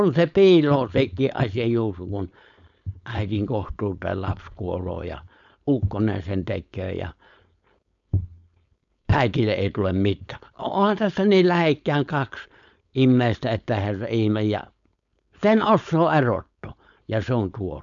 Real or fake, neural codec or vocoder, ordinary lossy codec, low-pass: fake; codec, 16 kHz, 4.8 kbps, FACodec; none; 7.2 kHz